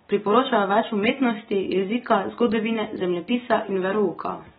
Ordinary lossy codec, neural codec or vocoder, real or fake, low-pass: AAC, 16 kbps; none; real; 7.2 kHz